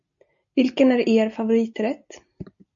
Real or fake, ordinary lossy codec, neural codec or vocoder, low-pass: real; MP3, 48 kbps; none; 7.2 kHz